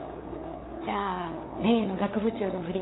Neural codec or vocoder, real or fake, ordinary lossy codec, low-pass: codec, 16 kHz, 8 kbps, FunCodec, trained on LibriTTS, 25 frames a second; fake; AAC, 16 kbps; 7.2 kHz